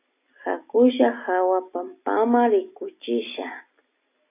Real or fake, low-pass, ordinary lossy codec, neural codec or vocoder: real; 3.6 kHz; AAC, 24 kbps; none